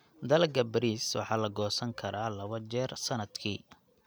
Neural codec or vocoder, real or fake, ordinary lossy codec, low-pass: none; real; none; none